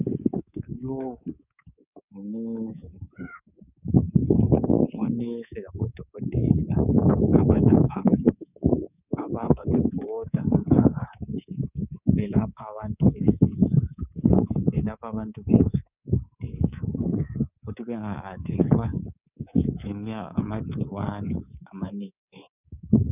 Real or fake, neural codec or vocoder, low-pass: fake; codec, 24 kHz, 3.1 kbps, DualCodec; 3.6 kHz